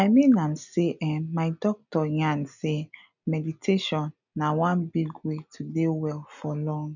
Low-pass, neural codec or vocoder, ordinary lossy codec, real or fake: 7.2 kHz; none; none; real